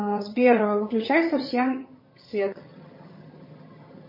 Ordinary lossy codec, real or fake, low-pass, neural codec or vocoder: MP3, 24 kbps; fake; 5.4 kHz; vocoder, 22.05 kHz, 80 mel bands, HiFi-GAN